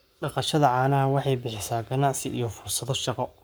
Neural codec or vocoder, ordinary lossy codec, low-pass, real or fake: codec, 44.1 kHz, 7.8 kbps, Pupu-Codec; none; none; fake